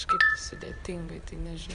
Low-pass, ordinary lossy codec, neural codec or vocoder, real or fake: 9.9 kHz; AAC, 96 kbps; vocoder, 22.05 kHz, 80 mel bands, Vocos; fake